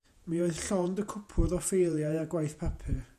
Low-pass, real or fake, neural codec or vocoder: 14.4 kHz; fake; vocoder, 48 kHz, 128 mel bands, Vocos